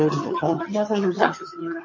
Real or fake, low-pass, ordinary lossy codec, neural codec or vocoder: fake; 7.2 kHz; MP3, 32 kbps; vocoder, 22.05 kHz, 80 mel bands, HiFi-GAN